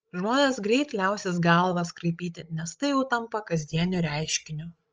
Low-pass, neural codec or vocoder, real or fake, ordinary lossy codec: 7.2 kHz; codec, 16 kHz, 16 kbps, FreqCodec, larger model; fake; Opus, 24 kbps